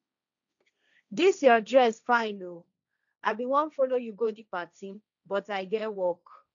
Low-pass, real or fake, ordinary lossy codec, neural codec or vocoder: 7.2 kHz; fake; none; codec, 16 kHz, 1.1 kbps, Voila-Tokenizer